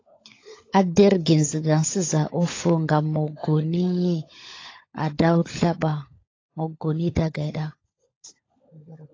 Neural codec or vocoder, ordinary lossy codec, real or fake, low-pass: codec, 16 kHz, 16 kbps, FunCodec, trained on LibriTTS, 50 frames a second; AAC, 32 kbps; fake; 7.2 kHz